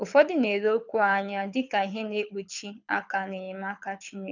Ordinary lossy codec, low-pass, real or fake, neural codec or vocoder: none; 7.2 kHz; fake; codec, 24 kHz, 6 kbps, HILCodec